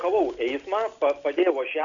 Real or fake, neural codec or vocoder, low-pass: real; none; 7.2 kHz